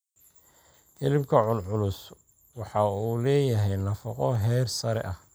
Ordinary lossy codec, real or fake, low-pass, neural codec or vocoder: none; real; none; none